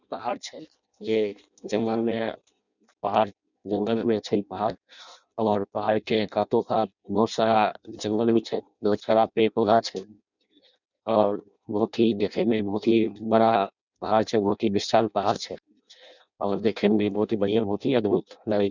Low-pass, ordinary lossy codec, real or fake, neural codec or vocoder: 7.2 kHz; none; fake; codec, 16 kHz in and 24 kHz out, 0.6 kbps, FireRedTTS-2 codec